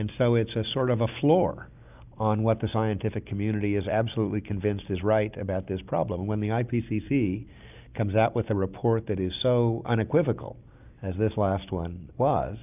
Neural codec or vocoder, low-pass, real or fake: none; 3.6 kHz; real